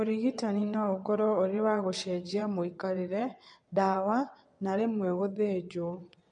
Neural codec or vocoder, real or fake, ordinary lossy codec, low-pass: vocoder, 22.05 kHz, 80 mel bands, WaveNeXt; fake; MP3, 48 kbps; 9.9 kHz